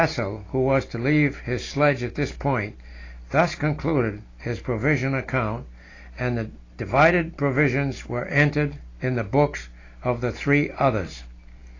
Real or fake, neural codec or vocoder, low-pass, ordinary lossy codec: real; none; 7.2 kHz; AAC, 32 kbps